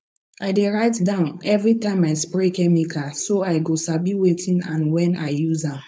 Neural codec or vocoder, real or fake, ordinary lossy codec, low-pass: codec, 16 kHz, 4.8 kbps, FACodec; fake; none; none